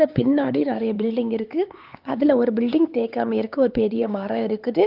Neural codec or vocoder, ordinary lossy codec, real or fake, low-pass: codec, 16 kHz, 4 kbps, X-Codec, HuBERT features, trained on LibriSpeech; Opus, 24 kbps; fake; 5.4 kHz